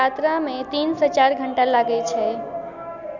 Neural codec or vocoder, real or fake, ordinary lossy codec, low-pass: none; real; none; 7.2 kHz